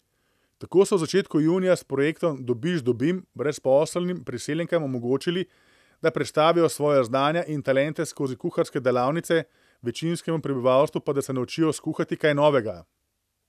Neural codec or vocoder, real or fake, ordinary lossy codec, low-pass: none; real; none; 14.4 kHz